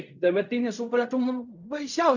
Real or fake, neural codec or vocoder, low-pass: fake; codec, 16 kHz in and 24 kHz out, 0.4 kbps, LongCat-Audio-Codec, fine tuned four codebook decoder; 7.2 kHz